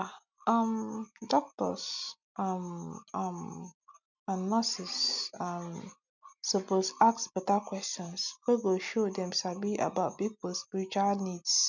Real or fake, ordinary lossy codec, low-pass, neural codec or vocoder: real; none; 7.2 kHz; none